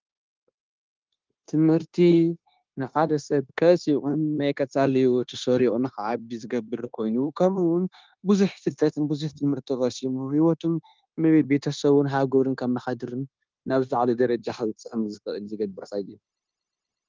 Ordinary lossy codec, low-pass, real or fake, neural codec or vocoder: Opus, 32 kbps; 7.2 kHz; fake; codec, 16 kHz, 0.9 kbps, LongCat-Audio-Codec